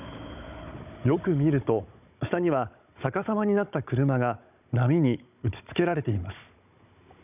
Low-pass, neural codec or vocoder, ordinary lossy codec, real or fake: 3.6 kHz; codec, 16 kHz, 16 kbps, FunCodec, trained on Chinese and English, 50 frames a second; none; fake